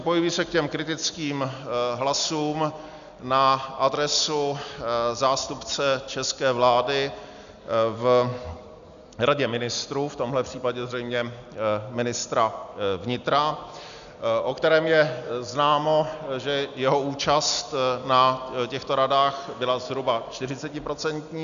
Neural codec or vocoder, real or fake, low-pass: none; real; 7.2 kHz